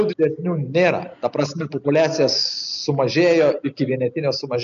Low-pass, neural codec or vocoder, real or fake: 7.2 kHz; none; real